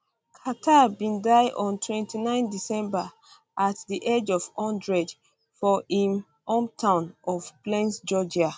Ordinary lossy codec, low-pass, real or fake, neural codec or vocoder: none; none; real; none